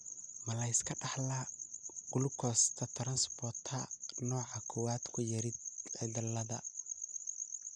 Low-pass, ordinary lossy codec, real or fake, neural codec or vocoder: 9.9 kHz; none; real; none